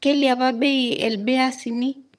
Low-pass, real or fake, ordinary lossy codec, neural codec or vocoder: none; fake; none; vocoder, 22.05 kHz, 80 mel bands, HiFi-GAN